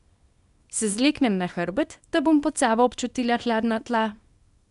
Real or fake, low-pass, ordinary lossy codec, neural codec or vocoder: fake; 10.8 kHz; none; codec, 24 kHz, 0.9 kbps, WavTokenizer, small release